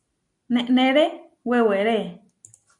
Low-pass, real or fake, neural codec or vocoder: 10.8 kHz; real; none